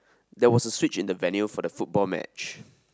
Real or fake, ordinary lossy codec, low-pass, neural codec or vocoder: real; none; none; none